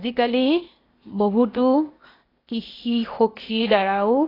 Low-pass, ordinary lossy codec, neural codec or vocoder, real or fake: 5.4 kHz; AAC, 24 kbps; codec, 16 kHz, 0.8 kbps, ZipCodec; fake